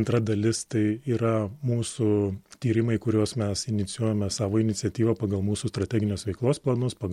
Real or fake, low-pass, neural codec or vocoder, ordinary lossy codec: real; 19.8 kHz; none; MP3, 64 kbps